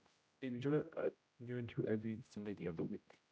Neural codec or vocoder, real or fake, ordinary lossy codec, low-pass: codec, 16 kHz, 0.5 kbps, X-Codec, HuBERT features, trained on general audio; fake; none; none